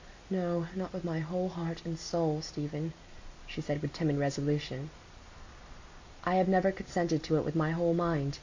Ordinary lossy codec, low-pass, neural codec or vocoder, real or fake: AAC, 48 kbps; 7.2 kHz; none; real